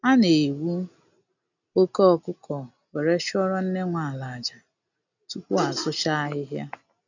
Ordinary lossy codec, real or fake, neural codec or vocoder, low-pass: none; real; none; 7.2 kHz